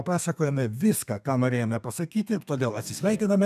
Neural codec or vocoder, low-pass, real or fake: codec, 32 kHz, 1.9 kbps, SNAC; 14.4 kHz; fake